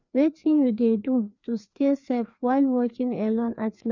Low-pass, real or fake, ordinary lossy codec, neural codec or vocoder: 7.2 kHz; fake; none; codec, 16 kHz, 2 kbps, FreqCodec, larger model